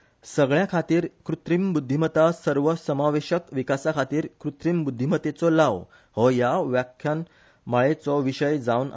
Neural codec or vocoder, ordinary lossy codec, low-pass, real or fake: none; none; none; real